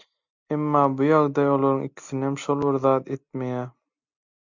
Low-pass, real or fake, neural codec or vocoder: 7.2 kHz; real; none